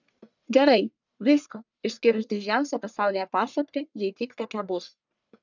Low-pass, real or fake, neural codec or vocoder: 7.2 kHz; fake; codec, 44.1 kHz, 1.7 kbps, Pupu-Codec